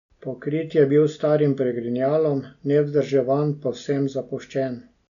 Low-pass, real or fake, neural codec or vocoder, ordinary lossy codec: 7.2 kHz; real; none; none